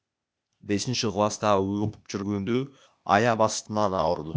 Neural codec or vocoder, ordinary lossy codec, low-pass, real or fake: codec, 16 kHz, 0.8 kbps, ZipCodec; none; none; fake